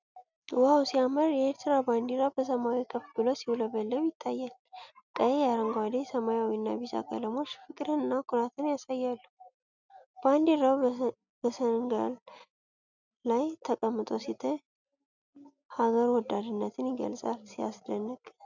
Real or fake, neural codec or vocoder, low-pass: real; none; 7.2 kHz